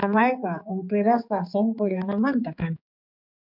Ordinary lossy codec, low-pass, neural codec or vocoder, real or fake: MP3, 48 kbps; 5.4 kHz; codec, 16 kHz, 4 kbps, X-Codec, HuBERT features, trained on general audio; fake